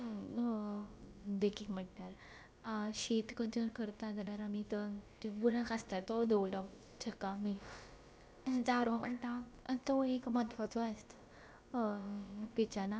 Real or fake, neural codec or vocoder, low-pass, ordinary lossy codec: fake; codec, 16 kHz, about 1 kbps, DyCAST, with the encoder's durations; none; none